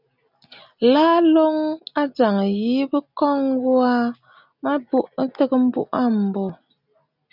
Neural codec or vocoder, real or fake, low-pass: none; real; 5.4 kHz